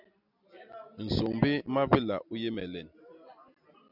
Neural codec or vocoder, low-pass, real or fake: none; 5.4 kHz; real